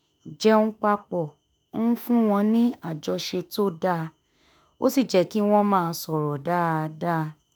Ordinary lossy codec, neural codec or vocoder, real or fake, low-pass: none; autoencoder, 48 kHz, 32 numbers a frame, DAC-VAE, trained on Japanese speech; fake; none